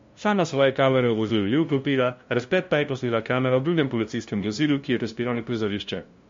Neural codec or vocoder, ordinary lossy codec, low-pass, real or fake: codec, 16 kHz, 0.5 kbps, FunCodec, trained on LibriTTS, 25 frames a second; MP3, 48 kbps; 7.2 kHz; fake